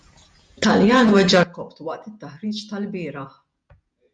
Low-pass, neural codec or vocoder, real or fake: 9.9 kHz; vocoder, 48 kHz, 128 mel bands, Vocos; fake